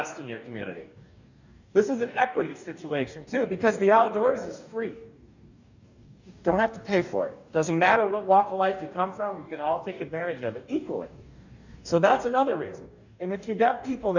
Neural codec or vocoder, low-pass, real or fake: codec, 44.1 kHz, 2.6 kbps, DAC; 7.2 kHz; fake